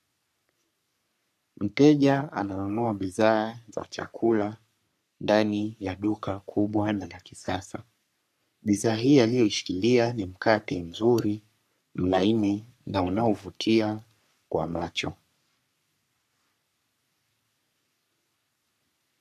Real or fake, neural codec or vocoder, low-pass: fake; codec, 44.1 kHz, 3.4 kbps, Pupu-Codec; 14.4 kHz